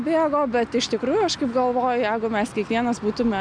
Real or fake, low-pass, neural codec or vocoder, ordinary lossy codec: real; 9.9 kHz; none; MP3, 96 kbps